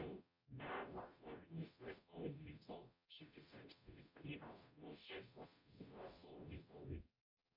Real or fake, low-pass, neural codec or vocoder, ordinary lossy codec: fake; 5.4 kHz; codec, 44.1 kHz, 0.9 kbps, DAC; MP3, 48 kbps